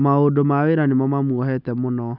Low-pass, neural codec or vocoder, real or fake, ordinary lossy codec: 5.4 kHz; none; real; none